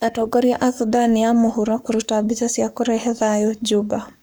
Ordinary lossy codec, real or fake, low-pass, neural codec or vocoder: none; fake; none; codec, 44.1 kHz, 7.8 kbps, Pupu-Codec